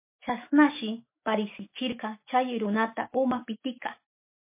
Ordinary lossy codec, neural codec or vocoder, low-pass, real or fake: MP3, 24 kbps; autoencoder, 48 kHz, 128 numbers a frame, DAC-VAE, trained on Japanese speech; 3.6 kHz; fake